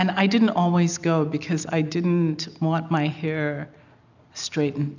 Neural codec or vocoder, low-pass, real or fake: none; 7.2 kHz; real